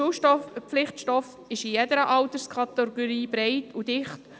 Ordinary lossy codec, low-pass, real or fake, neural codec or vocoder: none; none; real; none